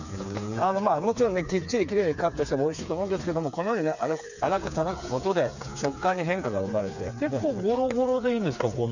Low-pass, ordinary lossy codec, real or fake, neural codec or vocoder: 7.2 kHz; none; fake; codec, 16 kHz, 4 kbps, FreqCodec, smaller model